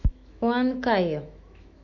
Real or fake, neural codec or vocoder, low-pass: fake; autoencoder, 48 kHz, 128 numbers a frame, DAC-VAE, trained on Japanese speech; 7.2 kHz